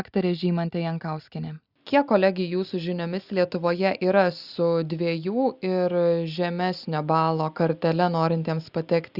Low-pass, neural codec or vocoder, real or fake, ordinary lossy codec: 5.4 kHz; none; real; Opus, 64 kbps